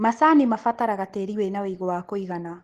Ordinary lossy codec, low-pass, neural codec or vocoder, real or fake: Opus, 16 kbps; 14.4 kHz; none; real